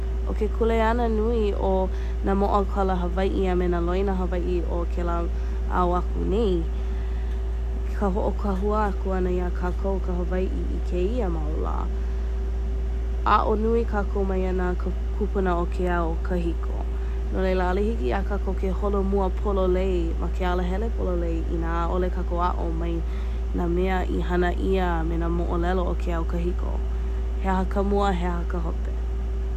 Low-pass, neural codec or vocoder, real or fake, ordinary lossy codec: 14.4 kHz; none; real; none